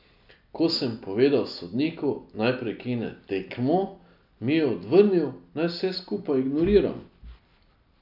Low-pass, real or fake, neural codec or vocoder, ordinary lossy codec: 5.4 kHz; real; none; none